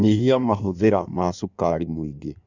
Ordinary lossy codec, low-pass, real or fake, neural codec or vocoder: none; 7.2 kHz; fake; codec, 16 kHz in and 24 kHz out, 1.1 kbps, FireRedTTS-2 codec